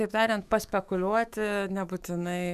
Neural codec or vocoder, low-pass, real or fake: autoencoder, 48 kHz, 128 numbers a frame, DAC-VAE, trained on Japanese speech; 14.4 kHz; fake